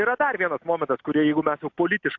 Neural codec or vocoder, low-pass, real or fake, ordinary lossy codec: none; 7.2 kHz; real; AAC, 48 kbps